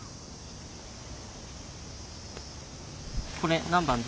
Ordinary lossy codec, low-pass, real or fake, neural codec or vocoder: none; none; real; none